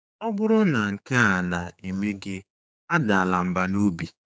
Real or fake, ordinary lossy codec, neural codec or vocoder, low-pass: fake; none; codec, 16 kHz, 4 kbps, X-Codec, HuBERT features, trained on general audio; none